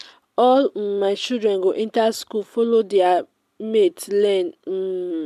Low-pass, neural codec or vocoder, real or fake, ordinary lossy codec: 14.4 kHz; none; real; MP3, 96 kbps